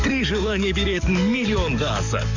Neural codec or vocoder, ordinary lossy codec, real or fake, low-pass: codec, 44.1 kHz, 7.8 kbps, DAC; none; fake; 7.2 kHz